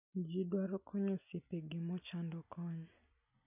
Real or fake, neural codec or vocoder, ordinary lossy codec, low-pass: real; none; none; 3.6 kHz